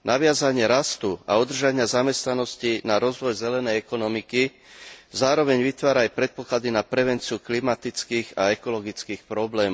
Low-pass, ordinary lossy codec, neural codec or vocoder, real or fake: none; none; none; real